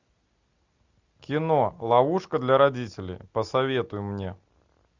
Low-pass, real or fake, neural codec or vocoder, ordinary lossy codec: 7.2 kHz; real; none; Opus, 64 kbps